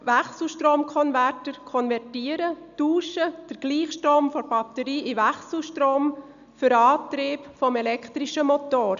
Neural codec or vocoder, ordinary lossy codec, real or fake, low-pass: none; none; real; 7.2 kHz